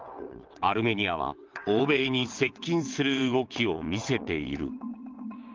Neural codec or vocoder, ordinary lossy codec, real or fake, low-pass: vocoder, 22.05 kHz, 80 mel bands, WaveNeXt; Opus, 32 kbps; fake; 7.2 kHz